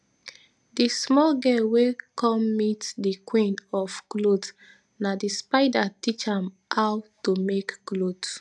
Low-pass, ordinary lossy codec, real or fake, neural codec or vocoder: none; none; real; none